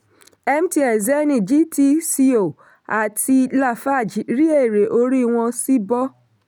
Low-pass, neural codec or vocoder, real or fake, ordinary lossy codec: none; none; real; none